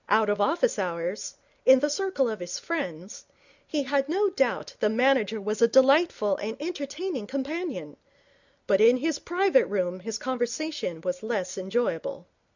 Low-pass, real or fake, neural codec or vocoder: 7.2 kHz; real; none